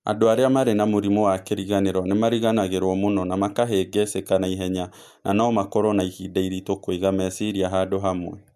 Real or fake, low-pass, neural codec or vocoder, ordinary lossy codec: real; 14.4 kHz; none; MP3, 96 kbps